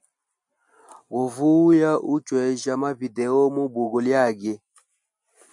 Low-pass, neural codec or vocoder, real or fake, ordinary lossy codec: 10.8 kHz; none; real; MP3, 64 kbps